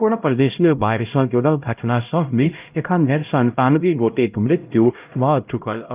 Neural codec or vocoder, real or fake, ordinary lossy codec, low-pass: codec, 16 kHz, 0.5 kbps, X-Codec, HuBERT features, trained on LibriSpeech; fake; Opus, 24 kbps; 3.6 kHz